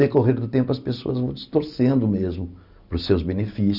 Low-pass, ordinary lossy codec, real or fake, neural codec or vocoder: 5.4 kHz; none; real; none